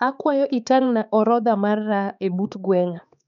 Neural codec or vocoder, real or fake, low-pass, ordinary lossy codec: codec, 16 kHz, 4 kbps, X-Codec, HuBERT features, trained on balanced general audio; fake; 7.2 kHz; none